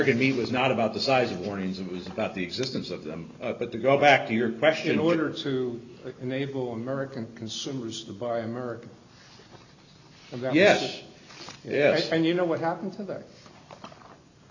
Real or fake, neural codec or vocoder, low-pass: real; none; 7.2 kHz